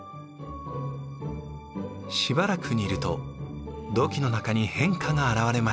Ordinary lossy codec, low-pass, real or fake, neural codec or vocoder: none; none; real; none